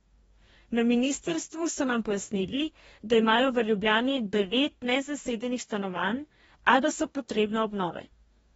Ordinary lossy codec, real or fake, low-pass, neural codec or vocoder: AAC, 24 kbps; fake; 19.8 kHz; codec, 44.1 kHz, 2.6 kbps, DAC